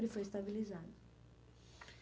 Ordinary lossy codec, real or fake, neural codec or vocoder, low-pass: none; real; none; none